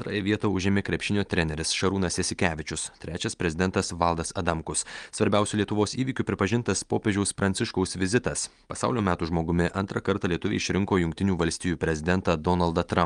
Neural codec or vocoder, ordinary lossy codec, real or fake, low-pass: none; Opus, 32 kbps; real; 9.9 kHz